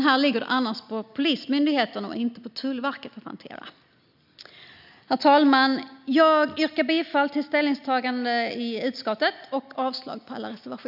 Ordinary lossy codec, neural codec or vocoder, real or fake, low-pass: none; none; real; 5.4 kHz